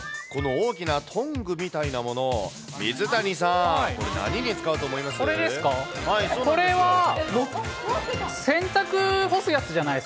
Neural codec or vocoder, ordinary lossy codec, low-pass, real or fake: none; none; none; real